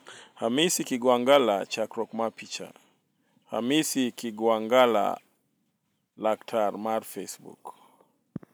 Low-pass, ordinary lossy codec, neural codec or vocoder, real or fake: none; none; none; real